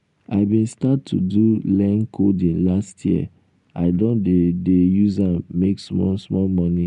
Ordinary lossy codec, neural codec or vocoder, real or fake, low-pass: none; none; real; 10.8 kHz